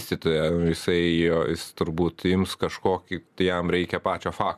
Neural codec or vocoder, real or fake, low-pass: none; real; 14.4 kHz